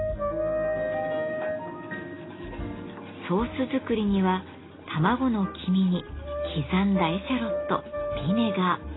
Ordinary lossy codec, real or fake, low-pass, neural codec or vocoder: AAC, 16 kbps; real; 7.2 kHz; none